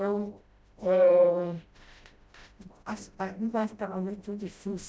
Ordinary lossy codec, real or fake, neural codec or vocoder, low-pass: none; fake; codec, 16 kHz, 0.5 kbps, FreqCodec, smaller model; none